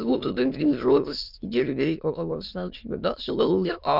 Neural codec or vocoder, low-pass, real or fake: autoencoder, 22.05 kHz, a latent of 192 numbers a frame, VITS, trained on many speakers; 5.4 kHz; fake